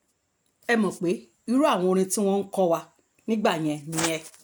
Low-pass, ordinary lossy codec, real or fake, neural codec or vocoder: none; none; real; none